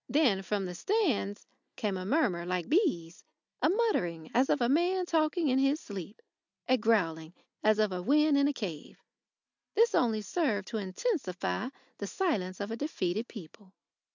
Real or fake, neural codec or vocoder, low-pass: real; none; 7.2 kHz